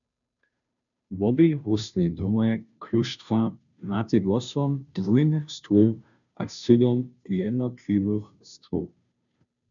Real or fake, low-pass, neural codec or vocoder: fake; 7.2 kHz; codec, 16 kHz, 0.5 kbps, FunCodec, trained on Chinese and English, 25 frames a second